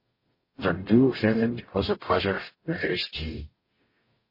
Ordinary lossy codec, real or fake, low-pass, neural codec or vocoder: MP3, 24 kbps; fake; 5.4 kHz; codec, 44.1 kHz, 0.9 kbps, DAC